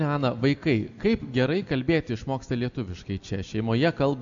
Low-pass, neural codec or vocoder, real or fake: 7.2 kHz; none; real